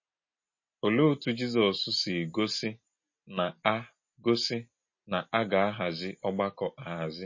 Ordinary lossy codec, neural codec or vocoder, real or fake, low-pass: MP3, 32 kbps; none; real; 7.2 kHz